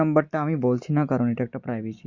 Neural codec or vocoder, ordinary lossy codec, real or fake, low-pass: none; Opus, 64 kbps; real; 7.2 kHz